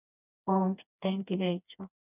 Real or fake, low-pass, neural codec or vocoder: fake; 3.6 kHz; codec, 16 kHz, 2 kbps, FreqCodec, smaller model